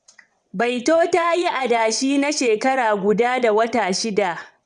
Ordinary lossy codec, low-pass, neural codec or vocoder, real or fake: none; 9.9 kHz; vocoder, 22.05 kHz, 80 mel bands, WaveNeXt; fake